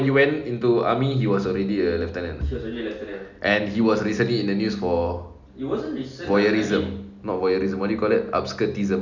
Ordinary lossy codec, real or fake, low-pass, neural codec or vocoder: none; real; 7.2 kHz; none